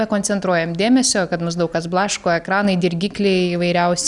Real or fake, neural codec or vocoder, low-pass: real; none; 10.8 kHz